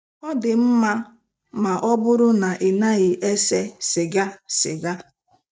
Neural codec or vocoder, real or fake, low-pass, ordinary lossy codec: none; real; none; none